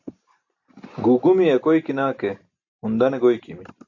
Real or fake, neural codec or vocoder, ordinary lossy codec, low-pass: real; none; AAC, 48 kbps; 7.2 kHz